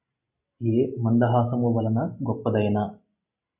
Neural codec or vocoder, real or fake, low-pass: none; real; 3.6 kHz